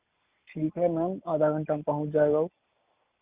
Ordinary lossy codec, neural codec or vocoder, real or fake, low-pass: Opus, 64 kbps; none; real; 3.6 kHz